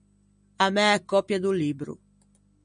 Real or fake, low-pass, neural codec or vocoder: real; 9.9 kHz; none